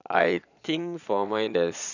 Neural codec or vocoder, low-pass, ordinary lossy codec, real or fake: vocoder, 22.05 kHz, 80 mel bands, Vocos; 7.2 kHz; none; fake